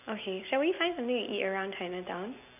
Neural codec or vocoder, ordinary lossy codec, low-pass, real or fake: none; none; 3.6 kHz; real